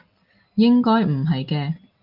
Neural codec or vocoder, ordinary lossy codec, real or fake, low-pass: none; Opus, 24 kbps; real; 5.4 kHz